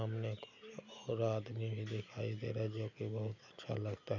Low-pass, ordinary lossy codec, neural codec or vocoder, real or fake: 7.2 kHz; none; none; real